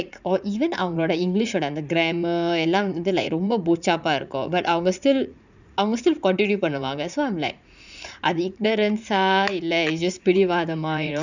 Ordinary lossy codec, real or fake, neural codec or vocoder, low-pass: none; fake; vocoder, 44.1 kHz, 80 mel bands, Vocos; 7.2 kHz